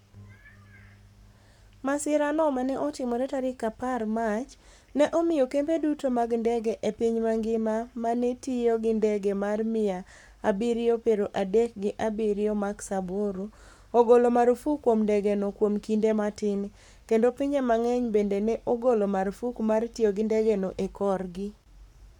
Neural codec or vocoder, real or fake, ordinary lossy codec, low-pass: codec, 44.1 kHz, 7.8 kbps, Pupu-Codec; fake; none; 19.8 kHz